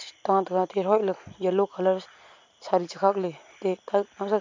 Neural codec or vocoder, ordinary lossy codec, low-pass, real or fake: none; MP3, 48 kbps; 7.2 kHz; real